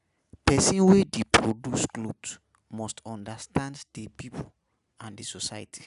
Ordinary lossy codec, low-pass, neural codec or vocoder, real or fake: none; 10.8 kHz; none; real